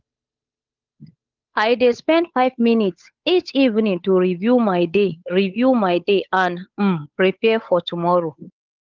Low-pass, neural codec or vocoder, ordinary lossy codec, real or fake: 7.2 kHz; codec, 16 kHz, 8 kbps, FunCodec, trained on Chinese and English, 25 frames a second; Opus, 24 kbps; fake